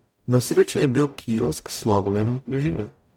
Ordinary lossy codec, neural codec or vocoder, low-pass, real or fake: MP3, 96 kbps; codec, 44.1 kHz, 0.9 kbps, DAC; 19.8 kHz; fake